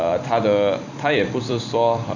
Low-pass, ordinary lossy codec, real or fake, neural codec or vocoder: 7.2 kHz; none; fake; vocoder, 44.1 kHz, 128 mel bands every 256 samples, BigVGAN v2